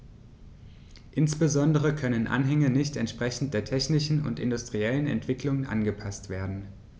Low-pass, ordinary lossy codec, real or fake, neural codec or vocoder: none; none; real; none